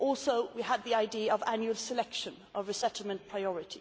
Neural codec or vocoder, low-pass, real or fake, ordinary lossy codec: none; none; real; none